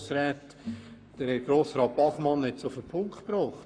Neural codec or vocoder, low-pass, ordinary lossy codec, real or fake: codec, 44.1 kHz, 7.8 kbps, Pupu-Codec; 9.9 kHz; none; fake